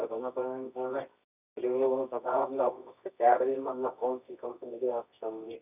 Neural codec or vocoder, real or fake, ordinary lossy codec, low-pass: codec, 24 kHz, 0.9 kbps, WavTokenizer, medium music audio release; fake; none; 3.6 kHz